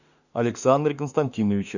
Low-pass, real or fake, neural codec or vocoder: 7.2 kHz; fake; autoencoder, 48 kHz, 32 numbers a frame, DAC-VAE, trained on Japanese speech